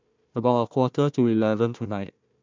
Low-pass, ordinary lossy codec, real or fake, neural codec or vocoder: 7.2 kHz; MP3, 64 kbps; fake; codec, 16 kHz, 1 kbps, FunCodec, trained on Chinese and English, 50 frames a second